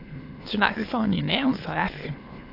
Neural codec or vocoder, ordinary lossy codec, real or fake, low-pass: autoencoder, 22.05 kHz, a latent of 192 numbers a frame, VITS, trained on many speakers; AAC, 32 kbps; fake; 5.4 kHz